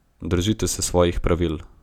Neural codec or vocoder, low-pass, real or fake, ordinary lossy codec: none; 19.8 kHz; real; none